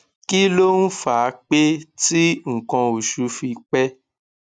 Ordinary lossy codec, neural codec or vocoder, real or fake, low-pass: none; none; real; 9.9 kHz